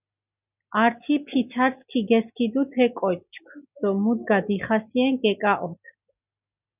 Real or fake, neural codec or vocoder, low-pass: real; none; 3.6 kHz